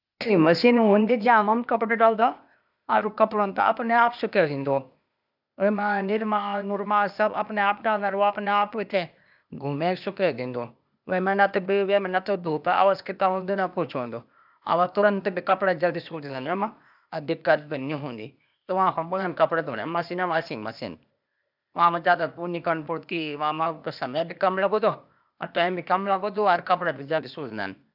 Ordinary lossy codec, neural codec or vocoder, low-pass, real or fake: none; codec, 16 kHz, 0.8 kbps, ZipCodec; 5.4 kHz; fake